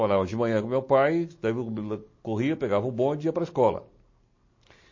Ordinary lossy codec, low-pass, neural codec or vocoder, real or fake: MP3, 32 kbps; 7.2 kHz; none; real